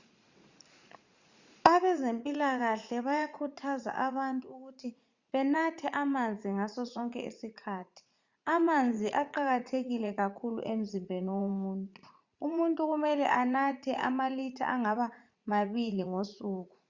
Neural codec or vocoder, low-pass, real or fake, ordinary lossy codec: vocoder, 44.1 kHz, 80 mel bands, Vocos; 7.2 kHz; fake; Opus, 64 kbps